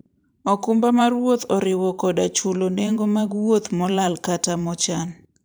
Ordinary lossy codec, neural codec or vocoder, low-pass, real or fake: none; vocoder, 44.1 kHz, 128 mel bands every 512 samples, BigVGAN v2; none; fake